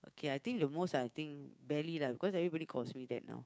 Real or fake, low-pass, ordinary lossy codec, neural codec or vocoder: fake; none; none; codec, 16 kHz, 6 kbps, DAC